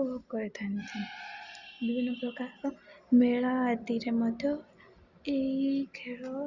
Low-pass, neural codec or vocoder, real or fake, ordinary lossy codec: 7.2 kHz; none; real; Opus, 64 kbps